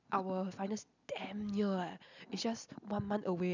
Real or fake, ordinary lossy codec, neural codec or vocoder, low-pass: real; none; none; 7.2 kHz